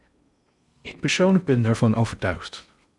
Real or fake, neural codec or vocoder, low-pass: fake; codec, 16 kHz in and 24 kHz out, 0.6 kbps, FocalCodec, streaming, 2048 codes; 10.8 kHz